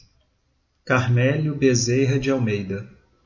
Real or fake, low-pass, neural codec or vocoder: real; 7.2 kHz; none